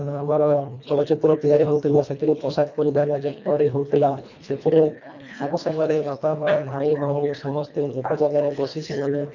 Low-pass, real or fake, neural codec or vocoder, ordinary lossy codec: 7.2 kHz; fake; codec, 24 kHz, 1.5 kbps, HILCodec; none